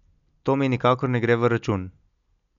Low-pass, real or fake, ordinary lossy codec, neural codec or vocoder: 7.2 kHz; real; none; none